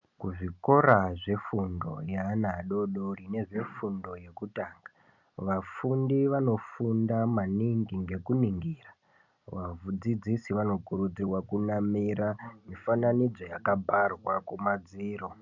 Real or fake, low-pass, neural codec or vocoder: real; 7.2 kHz; none